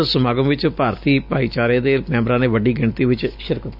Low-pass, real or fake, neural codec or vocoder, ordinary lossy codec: 5.4 kHz; real; none; none